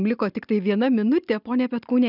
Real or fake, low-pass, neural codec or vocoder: real; 5.4 kHz; none